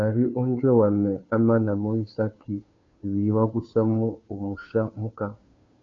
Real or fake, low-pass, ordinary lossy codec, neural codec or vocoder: fake; 7.2 kHz; MP3, 48 kbps; codec, 16 kHz, 2 kbps, FunCodec, trained on Chinese and English, 25 frames a second